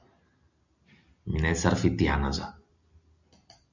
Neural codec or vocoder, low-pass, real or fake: none; 7.2 kHz; real